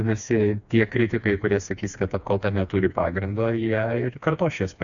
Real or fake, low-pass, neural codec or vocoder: fake; 7.2 kHz; codec, 16 kHz, 2 kbps, FreqCodec, smaller model